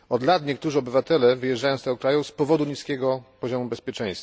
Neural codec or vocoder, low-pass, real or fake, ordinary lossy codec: none; none; real; none